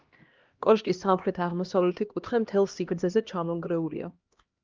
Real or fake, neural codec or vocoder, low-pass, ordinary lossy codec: fake; codec, 16 kHz, 1 kbps, X-Codec, HuBERT features, trained on LibriSpeech; 7.2 kHz; Opus, 24 kbps